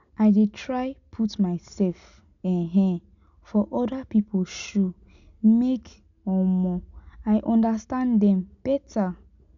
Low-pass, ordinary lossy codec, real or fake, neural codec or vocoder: 7.2 kHz; none; real; none